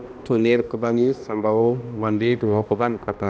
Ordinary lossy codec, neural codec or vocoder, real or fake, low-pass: none; codec, 16 kHz, 1 kbps, X-Codec, HuBERT features, trained on balanced general audio; fake; none